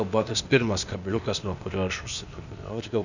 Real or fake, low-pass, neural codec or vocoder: fake; 7.2 kHz; codec, 16 kHz, 0.8 kbps, ZipCodec